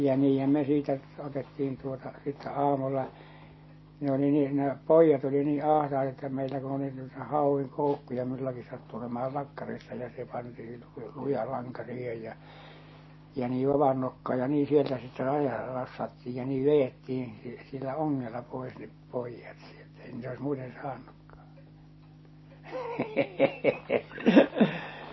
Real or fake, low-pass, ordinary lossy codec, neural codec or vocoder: real; 7.2 kHz; MP3, 24 kbps; none